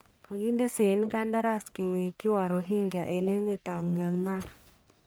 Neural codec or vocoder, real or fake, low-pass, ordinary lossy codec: codec, 44.1 kHz, 1.7 kbps, Pupu-Codec; fake; none; none